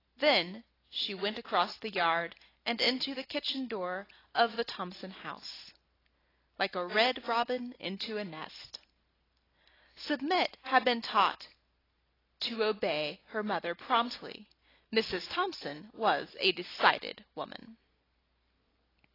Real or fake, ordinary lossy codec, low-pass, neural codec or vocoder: real; AAC, 24 kbps; 5.4 kHz; none